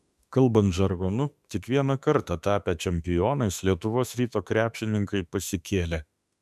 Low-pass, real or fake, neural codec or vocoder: 14.4 kHz; fake; autoencoder, 48 kHz, 32 numbers a frame, DAC-VAE, trained on Japanese speech